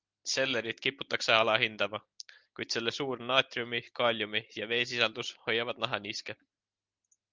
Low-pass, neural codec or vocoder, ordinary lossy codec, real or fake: 7.2 kHz; none; Opus, 32 kbps; real